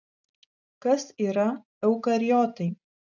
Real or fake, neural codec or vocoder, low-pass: real; none; 7.2 kHz